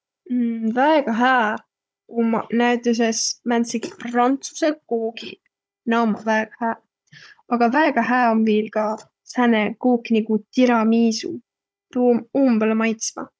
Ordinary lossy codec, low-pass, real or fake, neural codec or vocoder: none; none; fake; codec, 16 kHz, 16 kbps, FunCodec, trained on Chinese and English, 50 frames a second